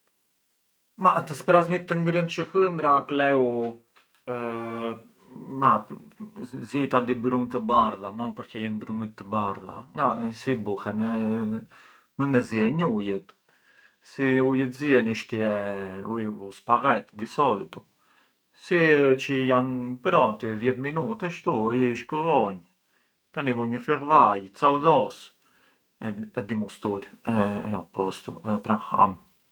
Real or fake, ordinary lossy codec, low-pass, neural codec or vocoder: fake; none; none; codec, 44.1 kHz, 2.6 kbps, SNAC